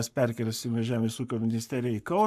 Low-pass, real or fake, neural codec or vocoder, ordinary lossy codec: 14.4 kHz; fake; codec, 44.1 kHz, 7.8 kbps, Pupu-Codec; AAC, 96 kbps